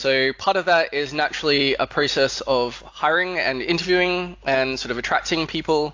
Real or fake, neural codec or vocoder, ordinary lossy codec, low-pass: real; none; AAC, 48 kbps; 7.2 kHz